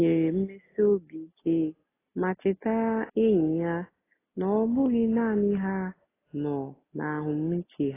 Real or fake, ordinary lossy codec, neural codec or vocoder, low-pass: real; AAC, 16 kbps; none; 3.6 kHz